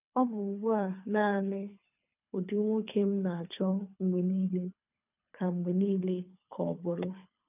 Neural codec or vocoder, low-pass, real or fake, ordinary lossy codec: codec, 24 kHz, 3 kbps, HILCodec; 3.6 kHz; fake; none